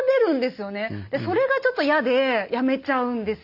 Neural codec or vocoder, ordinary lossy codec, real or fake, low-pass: none; none; real; 5.4 kHz